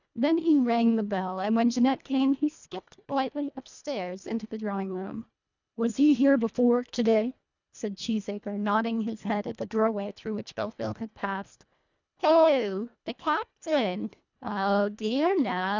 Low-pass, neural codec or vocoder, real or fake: 7.2 kHz; codec, 24 kHz, 1.5 kbps, HILCodec; fake